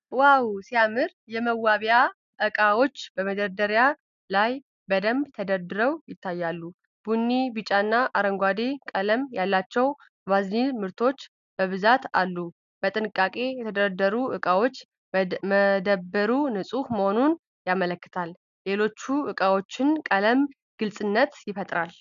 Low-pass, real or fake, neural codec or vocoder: 7.2 kHz; real; none